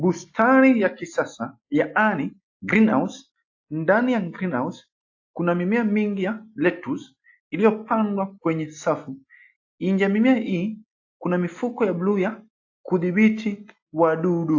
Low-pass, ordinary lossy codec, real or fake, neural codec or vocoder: 7.2 kHz; AAC, 48 kbps; real; none